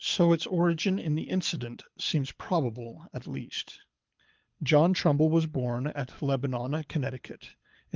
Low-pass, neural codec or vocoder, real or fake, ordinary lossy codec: 7.2 kHz; codec, 24 kHz, 6 kbps, HILCodec; fake; Opus, 32 kbps